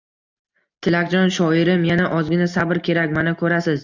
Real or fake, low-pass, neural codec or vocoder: real; 7.2 kHz; none